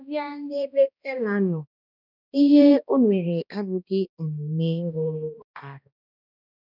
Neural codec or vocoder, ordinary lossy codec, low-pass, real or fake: codec, 16 kHz, 1 kbps, X-Codec, HuBERT features, trained on balanced general audio; none; 5.4 kHz; fake